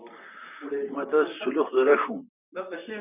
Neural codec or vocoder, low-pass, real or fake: vocoder, 44.1 kHz, 128 mel bands, Pupu-Vocoder; 3.6 kHz; fake